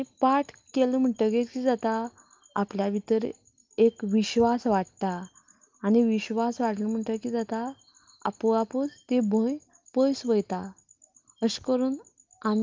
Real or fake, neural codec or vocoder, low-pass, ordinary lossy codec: real; none; 7.2 kHz; Opus, 32 kbps